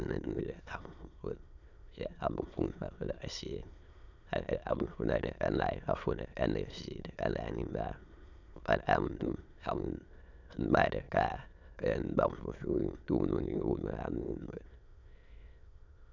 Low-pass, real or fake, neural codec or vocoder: 7.2 kHz; fake; autoencoder, 22.05 kHz, a latent of 192 numbers a frame, VITS, trained on many speakers